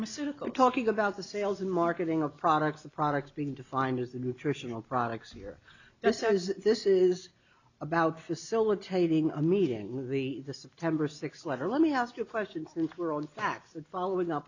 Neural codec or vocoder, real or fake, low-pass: none; real; 7.2 kHz